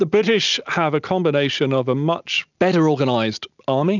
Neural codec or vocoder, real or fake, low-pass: none; real; 7.2 kHz